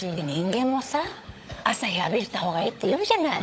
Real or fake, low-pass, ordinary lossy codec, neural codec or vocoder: fake; none; none; codec, 16 kHz, 16 kbps, FunCodec, trained on LibriTTS, 50 frames a second